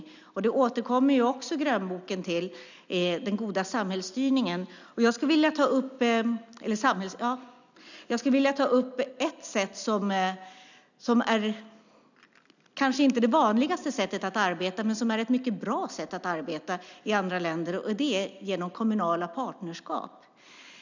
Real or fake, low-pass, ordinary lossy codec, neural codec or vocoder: real; 7.2 kHz; none; none